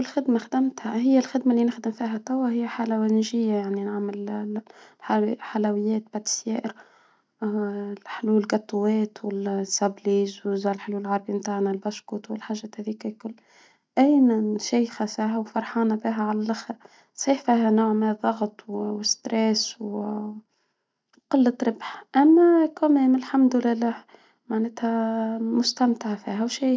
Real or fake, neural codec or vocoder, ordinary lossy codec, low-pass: real; none; none; none